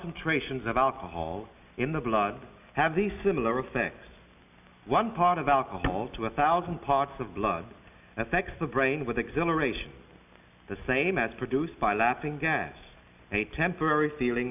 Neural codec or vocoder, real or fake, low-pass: none; real; 3.6 kHz